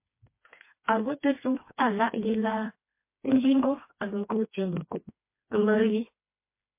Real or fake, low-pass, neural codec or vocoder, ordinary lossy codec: fake; 3.6 kHz; codec, 16 kHz, 2 kbps, FreqCodec, smaller model; MP3, 24 kbps